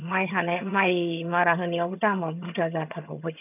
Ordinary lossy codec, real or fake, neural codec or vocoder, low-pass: none; fake; vocoder, 22.05 kHz, 80 mel bands, HiFi-GAN; 3.6 kHz